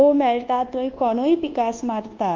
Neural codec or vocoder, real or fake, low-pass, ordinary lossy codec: codec, 24 kHz, 1.2 kbps, DualCodec; fake; 7.2 kHz; Opus, 24 kbps